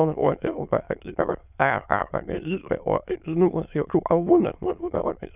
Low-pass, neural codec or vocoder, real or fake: 3.6 kHz; autoencoder, 22.05 kHz, a latent of 192 numbers a frame, VITS, trained on many speakers; fake